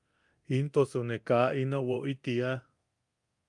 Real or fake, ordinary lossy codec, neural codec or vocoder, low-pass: fake; Opus, 32 kbps; codec, 24 kHz, 0.9 kbps, DualCodec; 10.8 kHz